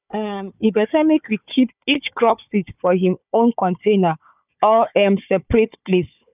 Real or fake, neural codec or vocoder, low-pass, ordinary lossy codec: fake; codec, 16 kHz, 4 kbps, FunCodec, trained on Chinese and English, 50 frames a second; 3.6 kHz; none